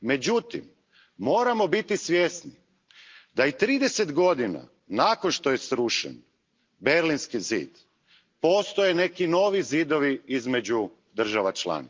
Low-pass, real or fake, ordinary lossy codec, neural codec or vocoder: 7.2 kHz; real; Opus, 24 kbps; none